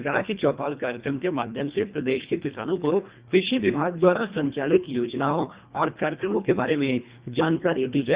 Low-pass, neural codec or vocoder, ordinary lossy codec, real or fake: 3.6 kHz; codec, 24 kHz, 1.5 kbps, HILCodec; Opus, 64 kbps; fake